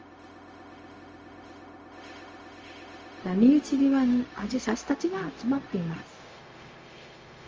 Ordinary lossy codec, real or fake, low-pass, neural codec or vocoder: Opus, 24 kbps; fake; 7.2 kHz; codec, 16 kHz, 0.4 kbps, LongCat-Audio-Codec